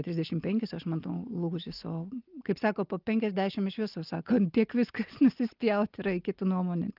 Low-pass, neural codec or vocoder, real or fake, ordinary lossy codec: 5.4 kHz; none; real; Opus, 32 kbps